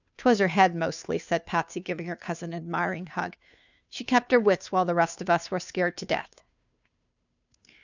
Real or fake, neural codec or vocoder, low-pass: fake; codec, 16 kHz, 2 kbps, FunCodec, trained on Chinese and English, 25 frames a second; 7.2 kHz